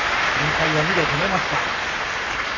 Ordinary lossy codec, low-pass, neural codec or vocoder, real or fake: none; 7.2 kHz; codec, 44.1 kHz, 7.8 kbps, Pupu-Codec; fake